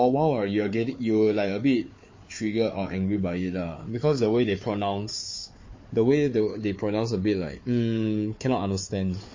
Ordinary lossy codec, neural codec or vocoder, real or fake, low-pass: MP3, 32 kbps; codec, 16 kHz, 4 kbps, FunCodec, trained on Chinese and English, 50 frames a second; fake; 7.2 kHz